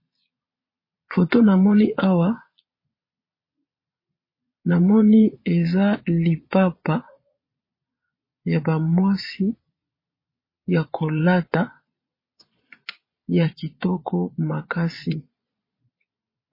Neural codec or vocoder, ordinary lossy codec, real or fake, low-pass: vocoder, 22.05 kHz, 80 mel bands, Vocos; MP3, 24 kbps; fake; 5.4 kHz